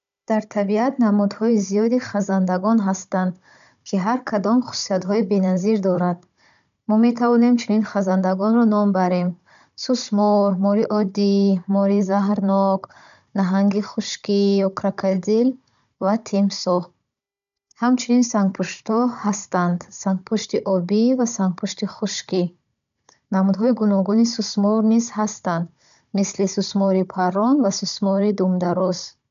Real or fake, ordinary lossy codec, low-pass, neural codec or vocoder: fake; none; 7.2 kHz; codec, 16 kHz, 16 kbps, FunCodec, trained on Chinese and English, 50 frames a second